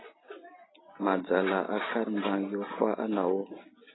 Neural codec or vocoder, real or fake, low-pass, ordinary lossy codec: none; real; 7.2 kHz; AAC, 16 kbps